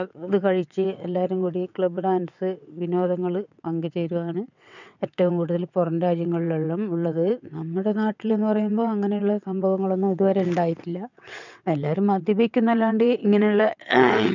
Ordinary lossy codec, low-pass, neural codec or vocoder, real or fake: none; 7.2 kHz; vocoder, 22.05 kHz, 80 mel bands, WaveNeXt; fake